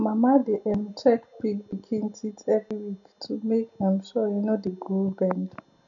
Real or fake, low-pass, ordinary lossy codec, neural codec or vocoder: real; 7.2 kHz; none; none